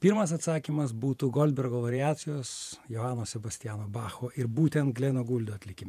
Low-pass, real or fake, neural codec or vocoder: 14.4 kHz; fake; vocoder, 48 kHz, 128 mel bands, Vocos